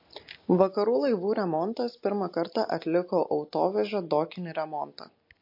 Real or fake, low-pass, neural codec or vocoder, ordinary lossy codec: real; 5.4 kHz; none; MP3, 32 kbps